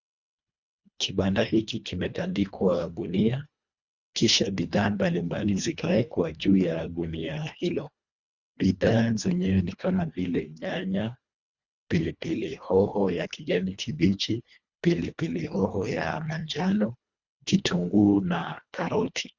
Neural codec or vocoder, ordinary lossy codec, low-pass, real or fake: codec, 24 kHz, 1.5 kbps, HILCodec; Opus, 64 kbps; 7.2 kHz; fake